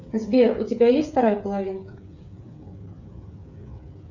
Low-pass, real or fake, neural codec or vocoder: 7.2 kHz; fake; codec, 16 kHz, 8 kbps, FreqCodec, smaller model